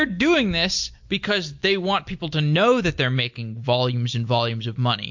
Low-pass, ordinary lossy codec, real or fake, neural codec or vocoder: 7.2 kHz; MP3, 48 kbps; real; none